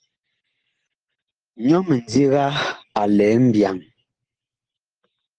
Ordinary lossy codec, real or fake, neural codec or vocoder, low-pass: Opus, 16 kbps; real; none; 9.9 kHz